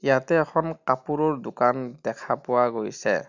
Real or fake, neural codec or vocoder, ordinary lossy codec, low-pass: real; none; none; 7.2 kHz